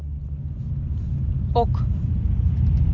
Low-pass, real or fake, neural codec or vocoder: 7.2 kHz; real; none